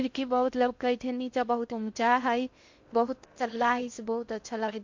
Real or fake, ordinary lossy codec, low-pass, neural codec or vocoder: fake; MP3, 48 kbps; 7.2 kHz; codec, 16 kHz in and 24 kHz out, 0.6 kbps, FocalCodec, streaming, 2048 codes